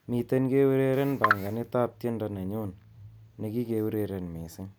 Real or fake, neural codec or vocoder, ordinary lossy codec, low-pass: real; none; none; none